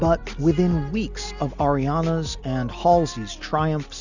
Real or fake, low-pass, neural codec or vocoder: real; 7.2 kHz; none